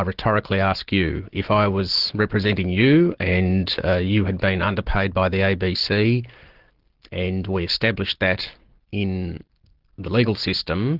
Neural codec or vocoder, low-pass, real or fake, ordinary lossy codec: none; 5.4 kHz; real; Opus, 16 kbps